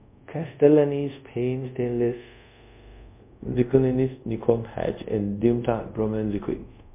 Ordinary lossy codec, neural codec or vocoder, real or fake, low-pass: MP3, 32 kbps; codec, 24 kHz, 0.5 kbps, DualCodec; fake; 3.6 kHz